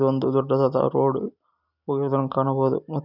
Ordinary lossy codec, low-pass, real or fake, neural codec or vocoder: none; 5.4 kHz; real; none